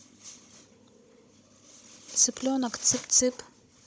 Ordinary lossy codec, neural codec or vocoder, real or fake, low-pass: none; codec, 16 kHz, 16 kbps, FunCodec, trained on Chinese and English, 50 frames a second; fake; none